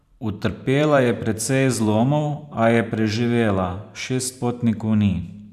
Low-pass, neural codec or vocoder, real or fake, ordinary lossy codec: 14.4 kHz; vocoder, 44.1 kHz, 128 mel bands every 512 samples, BigVGAN v2; fake; none